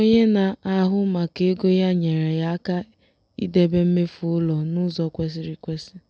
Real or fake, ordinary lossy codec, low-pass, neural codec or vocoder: real; none; none; none